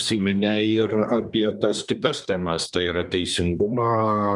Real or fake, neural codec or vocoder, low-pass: fake; codec, 24 kHz, 1 kbps, SNAC; 10.8 kHz